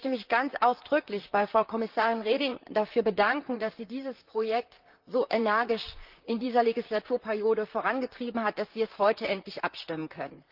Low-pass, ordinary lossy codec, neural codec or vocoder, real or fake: 5.4 kHz; Opus, 32 kbps; vocoder, 44.1 kHz, 128 mel bands, Pupu-Vocoder; fake